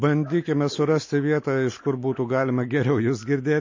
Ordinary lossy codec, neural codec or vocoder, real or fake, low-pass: MP3, 32 kbps; none; real; 7.2 kHz